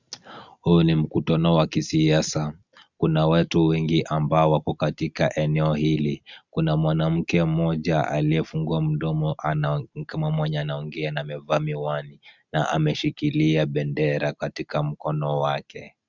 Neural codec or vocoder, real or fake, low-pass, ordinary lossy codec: none; real; 7.2 kHz; Opus, 64 kbps